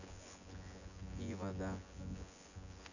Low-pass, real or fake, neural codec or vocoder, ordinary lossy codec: 7.2 kHz; fake; vocoder, 24 kHz, 100 mel bands, Vocos; none